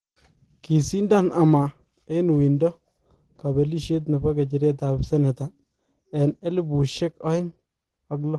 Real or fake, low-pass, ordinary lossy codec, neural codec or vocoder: real; 10.8 kHz; Opus, 16 kbps; none